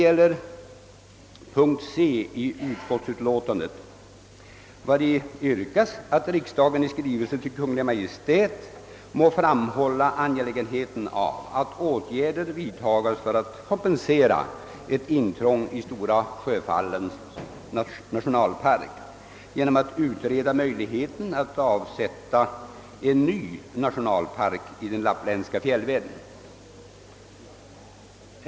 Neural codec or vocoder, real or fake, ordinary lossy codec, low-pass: none; real; none; none